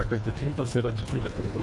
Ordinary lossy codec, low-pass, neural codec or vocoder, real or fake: AAC, 64 kbps; 10.8 kHz; codec, 24 kHz, 1.5 kbps, HILCodec; fake